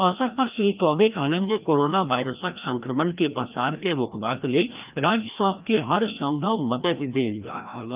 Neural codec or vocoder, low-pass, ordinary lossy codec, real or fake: codec, 16 kHz, 1 kbps, FreqCodec, larger model; 3.6 kHz; Opus, 32 kbps; fake